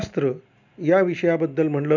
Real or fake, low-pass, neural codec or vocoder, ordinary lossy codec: real; 7.2 kHz; none; none